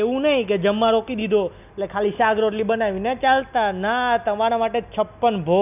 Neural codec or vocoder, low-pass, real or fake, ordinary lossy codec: none; 3.6 kHz; real; none